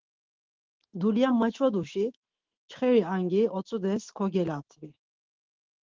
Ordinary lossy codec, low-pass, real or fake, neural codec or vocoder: Opus, 16 kbps; 7.2 kHz; fake; autoencoder, 48 kHz, 128 numbers a frame, DAC-VAE, trained on Japanese speech